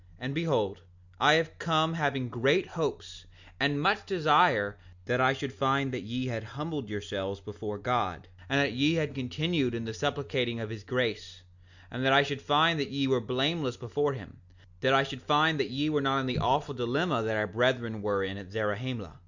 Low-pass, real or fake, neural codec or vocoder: 7.2 kHz; real; none